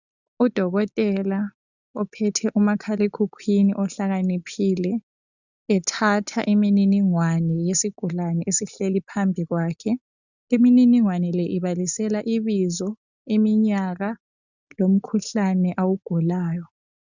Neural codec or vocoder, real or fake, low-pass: none; real; 7.2 kHz